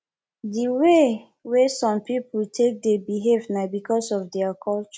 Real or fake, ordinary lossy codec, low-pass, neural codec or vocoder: real; none; none; none